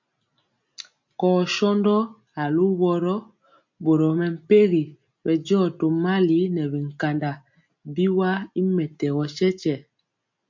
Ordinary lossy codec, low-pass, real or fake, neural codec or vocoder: MP3, 48 kbps; 7.2 kHz; real; none